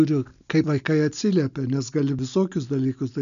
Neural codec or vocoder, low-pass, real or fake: none; 7.2 kHz; real